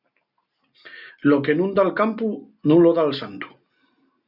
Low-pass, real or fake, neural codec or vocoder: 5.4 kHz; real; none